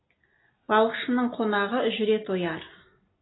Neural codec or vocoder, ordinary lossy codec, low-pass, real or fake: none; AAC, 16 kbps; 7.2 kHz; real